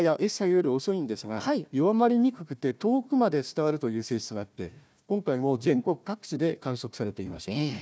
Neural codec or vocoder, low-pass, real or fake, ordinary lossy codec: codec, 16 kHz, 1 kbps, FunCodec, trained on Chinese and English, 50 frames a second; none; fake; none